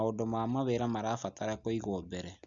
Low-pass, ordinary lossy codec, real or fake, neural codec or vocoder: 7.2 kHz; none; real; none